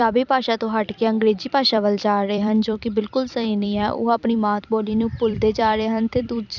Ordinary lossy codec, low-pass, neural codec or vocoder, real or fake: none; 7.2 kHz; vocoder, 44.1 kHz, 128 mel bands every 256 samples, BigVGAN v2; fake